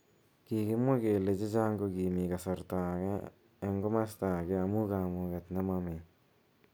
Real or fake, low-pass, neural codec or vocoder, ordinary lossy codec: real; none; none; none